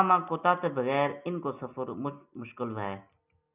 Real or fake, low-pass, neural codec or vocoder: real; 3.6 kHz; none